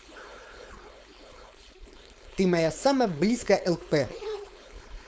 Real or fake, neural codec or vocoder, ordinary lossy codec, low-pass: fake; codec, 16 kHz, 4.8 kbps, FACodec; none; none